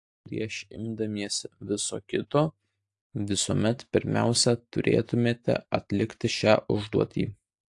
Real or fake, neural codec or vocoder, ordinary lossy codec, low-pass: real; none; AAC, 64 kbps; 10.8 kHz